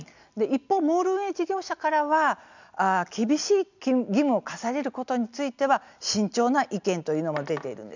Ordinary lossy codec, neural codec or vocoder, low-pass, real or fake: none; none; 7.2 kHz; real